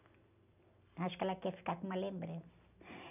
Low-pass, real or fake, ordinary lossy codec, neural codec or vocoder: 3.6 kHz; real; none; none